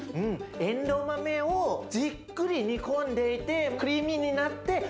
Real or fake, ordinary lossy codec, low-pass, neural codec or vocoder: real; none; none; none